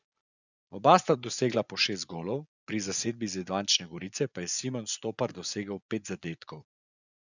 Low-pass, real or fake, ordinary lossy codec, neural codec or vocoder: 7.2 kHz; fake; none; vocoder, 24 kHz, 100 mel bands, Vocos